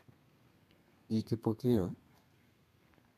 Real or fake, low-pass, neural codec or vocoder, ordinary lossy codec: fake; 14.4 kHz; codec, 32 kHz, 1.9 kbps, SNAC; none